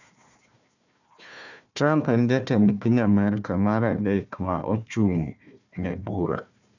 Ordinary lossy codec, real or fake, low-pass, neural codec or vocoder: none; fake; 7.2 kHz; codec, 16 kHz, 1 kbps, FunCodec, trained on Chinese and English, 50 frames a second